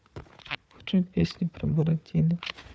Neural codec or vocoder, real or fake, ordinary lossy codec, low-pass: codec, 16 kHz, 4 kbps, FunCodec, trained on Chinese and English, 50 frames a second; fake; none; none